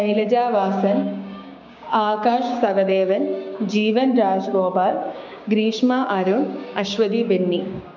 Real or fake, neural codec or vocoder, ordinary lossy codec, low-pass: fake; codec, 16 kHz, 6 kbps, DAC; none; 7.2 kHz